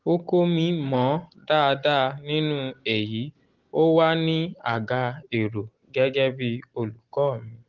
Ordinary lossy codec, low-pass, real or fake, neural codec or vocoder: Opus, 16 kbps; 7.2 kHz; real; none